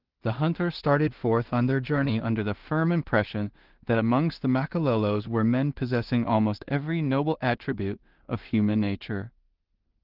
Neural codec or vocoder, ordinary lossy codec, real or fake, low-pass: codec, 16 kHz in and 24 kHz out, 0.4 kbps, LongCat-Audio-Codec, two codebook decoder; Opus, 16 kbps; fake; 5.4 kHz